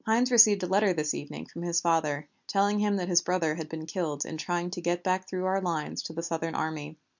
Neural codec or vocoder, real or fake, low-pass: none; real; 7.2 kHz